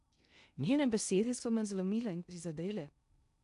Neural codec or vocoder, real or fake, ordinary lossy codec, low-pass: codec, 16 kHz in and 24 kHz out, 0.6 kbps, FocalCodec, streaming, 2048 codes; fake; none; 10.8 kHz